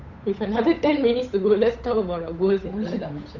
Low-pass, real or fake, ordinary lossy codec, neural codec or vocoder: 7.2 kHz; fake; none; codec, 16 kHz, 8 kbps, FunCodec, trained on Chinese and English, 25 frames a second